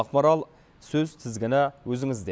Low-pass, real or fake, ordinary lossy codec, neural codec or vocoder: none; real; none; none